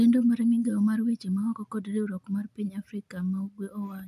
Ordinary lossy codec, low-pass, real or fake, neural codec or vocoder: none; 14.4 kHz; real; none